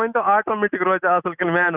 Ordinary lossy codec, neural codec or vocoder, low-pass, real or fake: none; none; 3.6 kHz; real